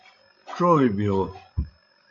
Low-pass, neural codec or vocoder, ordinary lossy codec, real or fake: 7.2 kHz; codec, 16 kHz, 16 kbps, FreqCodec, larger model; AAC, 48 kbps; fake